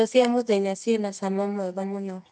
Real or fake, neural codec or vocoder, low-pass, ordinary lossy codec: fake; codec, 24 kHz, 0.9 kbps, WavTokenizer, medium music audio release; 9.9 kHz; none